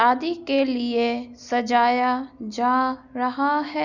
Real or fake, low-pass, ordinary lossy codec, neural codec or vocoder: real; 7.2 kHz; none; none